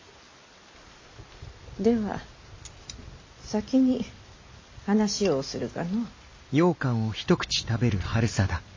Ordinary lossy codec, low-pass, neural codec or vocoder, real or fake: MP3, 32 kbps; 7.2 kHz; none; real